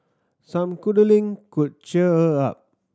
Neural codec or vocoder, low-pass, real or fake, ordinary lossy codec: none; none; real; none